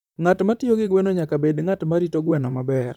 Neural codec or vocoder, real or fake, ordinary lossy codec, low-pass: vocoder, 44.1 kHz, 128 mel bands, Pupu-Vocoder; fake; none; 19.8 kHz